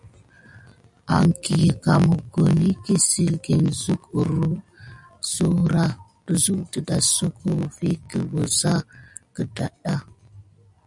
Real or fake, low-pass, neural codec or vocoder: real; 10.8 kHz; none